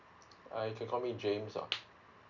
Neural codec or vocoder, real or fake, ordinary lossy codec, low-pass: none; real; none; 7.2 kHz